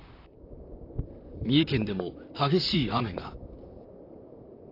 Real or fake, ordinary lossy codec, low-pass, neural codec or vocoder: fake; AAC, 32 kbps; 5.4 kHz; vocoder, 44.1 kHz, 128 mel bands, Pupu-Vocoder